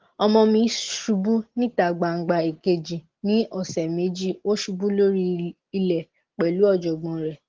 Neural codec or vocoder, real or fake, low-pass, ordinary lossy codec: none; real; 7.2 kHz; Opus, 16 kbps